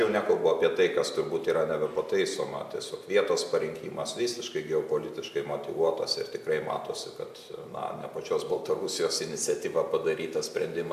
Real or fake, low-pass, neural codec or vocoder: real; 14.4 kHz; none